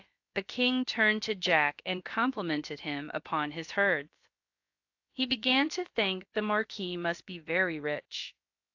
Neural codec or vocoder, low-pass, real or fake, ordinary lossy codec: codec, 16 kHz, about 1 kbps, DyCAST, with the encoder's durations; 7.2 kHz; fake; AAC, 48 kbps